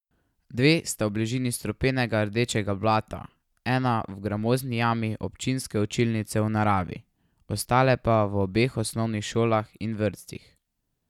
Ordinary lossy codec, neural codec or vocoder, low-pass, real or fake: none; none; 19.8 kHz; real